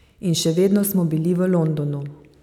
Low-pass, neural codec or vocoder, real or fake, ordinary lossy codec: 19.8 kHz; none; real; none